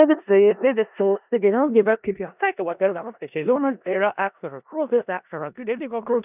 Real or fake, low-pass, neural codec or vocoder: fake; 3.6 kHz; codec, 16 kHz in and 24 kHz out, 0.4 kbps, LongCat-Audio-Codec, four codebook decoder